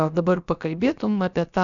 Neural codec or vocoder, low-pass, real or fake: codec, 16 kHz, about 1 kbps, DyCAST, with the encoder's durations; 7.2 kHz; fake